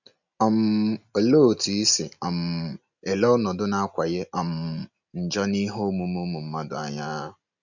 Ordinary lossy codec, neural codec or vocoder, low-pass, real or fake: none; none; 7.2 kHz; real